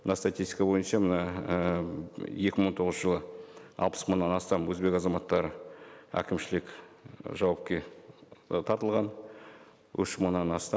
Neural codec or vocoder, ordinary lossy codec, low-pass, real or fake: none; none; none; real